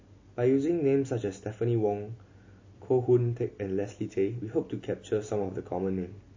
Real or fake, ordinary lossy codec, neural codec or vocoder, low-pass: real; MP3, 32 kbps; none; 7.2 kHz